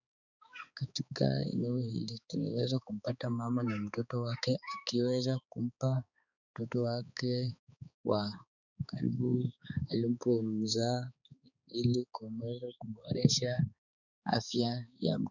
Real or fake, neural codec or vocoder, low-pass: fake; codec, 16 kHz, 4 kbps, X-Codec, HuBERT features, trained on balanced general audio; 7.2 kHz